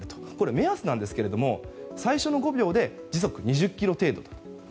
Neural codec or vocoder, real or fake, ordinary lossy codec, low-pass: none; real; none; none